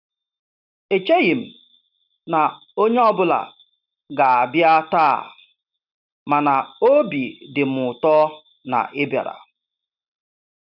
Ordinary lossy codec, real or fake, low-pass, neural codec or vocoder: none; real; 5.4 kHz; none